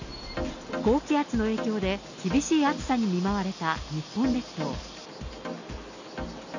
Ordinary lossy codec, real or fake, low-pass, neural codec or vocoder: none; real; 7.2 kHz; none